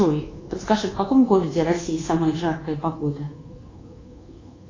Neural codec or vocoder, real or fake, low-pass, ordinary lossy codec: codec, 24 kHz, 1.2 kbps, DualCodec; fake; 7.2 kHz; AAC, 32 kbps